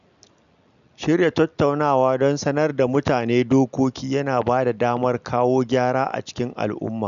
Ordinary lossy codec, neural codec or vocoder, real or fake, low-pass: none; none; real; 7.2 kHz